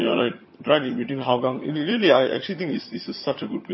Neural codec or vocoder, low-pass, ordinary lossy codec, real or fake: vocoder, 22.05 kHz, 80 mel bands, HiFi-GAN; 7.2 kHz; MP3, 24 kbps; fake